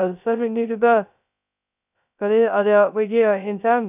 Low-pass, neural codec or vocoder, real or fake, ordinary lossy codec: 3.6 kHz; codec, 16 kHz, 0.2 kbps, FocalCodec; fake; none